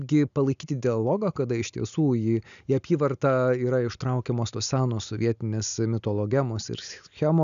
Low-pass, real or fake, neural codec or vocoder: 7.2 kHz; real; none